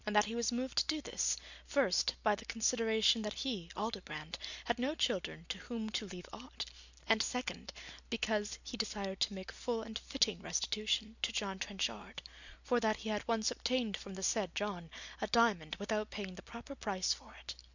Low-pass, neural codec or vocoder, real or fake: 7.2 kHz; none; real